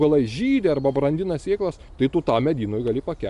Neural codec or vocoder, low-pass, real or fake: none; 10.8 kHz; real